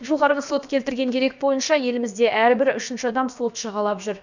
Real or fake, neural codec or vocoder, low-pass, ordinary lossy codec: fake; codec, 16 kHz, about 1 kbps, DyCAST, with the encoder's durations; 7.2 kHz; none